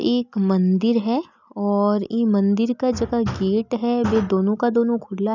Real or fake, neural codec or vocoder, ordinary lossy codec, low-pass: real; none; none; 7.2 kHz